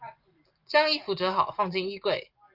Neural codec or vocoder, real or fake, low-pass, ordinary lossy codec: none; real; 5.4 kHz; Opus, 24 kbps